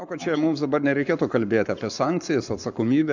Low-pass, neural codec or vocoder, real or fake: 7.2 kHz; codec, 44.1 kHz, 7.8 kbps, Pupu-Codec; fake